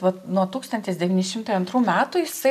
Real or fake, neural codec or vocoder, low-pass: real; none; 14.4 kHz